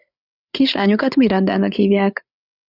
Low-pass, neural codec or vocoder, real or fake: 5.4 kHz; codec, 16 kHz, 8 kbps, FreqCodec, larger model; fake